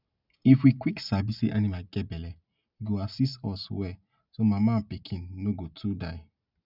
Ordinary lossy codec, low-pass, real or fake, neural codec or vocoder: none; 5.4 kHz; real; none